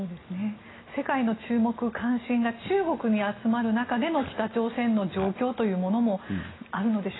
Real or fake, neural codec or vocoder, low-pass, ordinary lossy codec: real; none; 7.2 kHz; AAC, 16 kbps